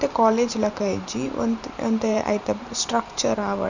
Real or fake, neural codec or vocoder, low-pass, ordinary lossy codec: fake; vocoder, 44.1 kHz, 128 mel bands every 512 samples, BigVGAN v2; 7.2 kHz; none